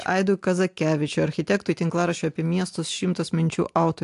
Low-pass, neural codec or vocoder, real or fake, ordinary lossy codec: 10.8 kHz; none; real; AAC, 64 kbps